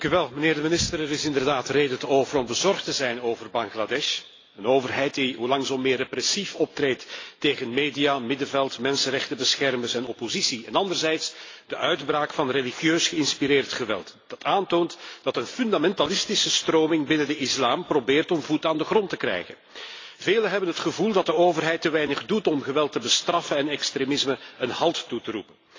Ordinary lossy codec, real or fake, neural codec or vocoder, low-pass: AAC, 32 kbps; real; none; 7.2 kHz